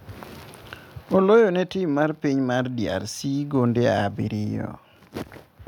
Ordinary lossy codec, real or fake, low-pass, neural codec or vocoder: none; real; 19.8 kHz; none